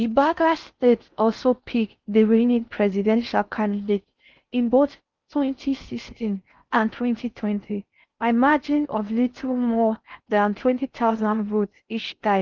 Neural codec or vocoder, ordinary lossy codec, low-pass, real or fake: codec, 16 kHz in and 24 kHz out, 0.6 kbps, FocalCodec, streaming, 4096 codes; Opus, 24 kbps; 7.2 kHz; fake